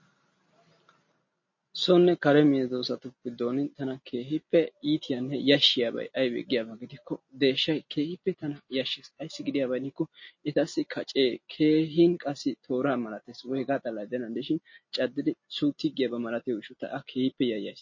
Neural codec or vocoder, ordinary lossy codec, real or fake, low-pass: none; MP3, 32 kbps; real; 7.2 kHz